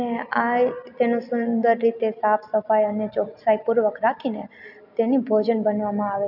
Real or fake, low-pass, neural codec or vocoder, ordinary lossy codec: real; 5.4 kHz; none; none